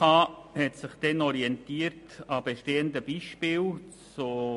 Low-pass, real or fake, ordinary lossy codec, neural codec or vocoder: 14.4 kHz; real; MP3, 48 kbps; none